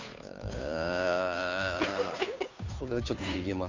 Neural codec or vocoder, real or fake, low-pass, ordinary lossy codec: codec, 16 kHz, 2 kbps, FunCodec, trained on Chinese and English, 25 frames a second; fake; 7.2 kHz; MP3, 64 kbps